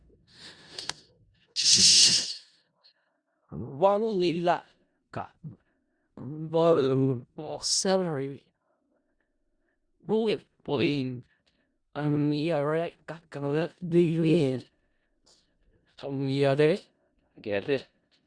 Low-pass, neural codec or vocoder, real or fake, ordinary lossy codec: 9.9 kHz; codec, 16 kHz in and 24 kHz out, 0.4 kbps, LongCat-Audio-Codec, four codebook decoder; fake; Opus, 64 kbps